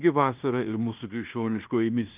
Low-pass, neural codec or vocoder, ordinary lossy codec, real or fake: 3.6 kHz; codec, 16 kHz in and 24 kHz out, 0.9 kbps, LongCat-Audio-Codec, fine tuned four codebook decoder; Opus, 24 kbps; fake